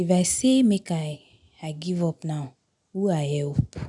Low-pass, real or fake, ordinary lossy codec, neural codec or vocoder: 10.8 kHz; real; none; none